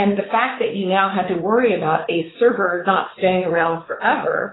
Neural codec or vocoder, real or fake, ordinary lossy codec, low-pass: codec, 16 kHz, 4 kbps, X-Codec, WavLM features, trained on Multilingual LibriSpeech; fake; AAC, 16 kbps; 7.2 kHz